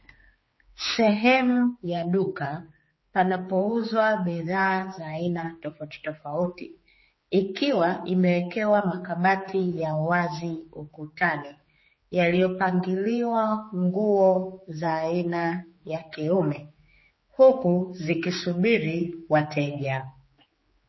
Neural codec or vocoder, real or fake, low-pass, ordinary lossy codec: codec, 16 kHz, 4 kbps, X-Codec, HuBERT features, trained on general audio; fake; 7.2 kHz; MP3, 24 kbps